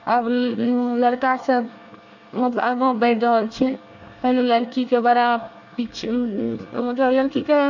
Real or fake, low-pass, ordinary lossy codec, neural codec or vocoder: fake; 7.2 kHz; none; codec, 24 kHz, 1 kbps, SNAC